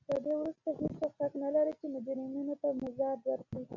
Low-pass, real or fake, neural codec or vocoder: 7.2 kHz; real; none